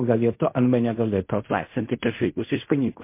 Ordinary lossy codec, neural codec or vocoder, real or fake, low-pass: MP3, 24 kbps; codec, 16 kHz in and 24 kHz out, 0.4 kbps, LongCat-Audio-Codec, fine tuned four codebook decoder; fake; 3.6 kHz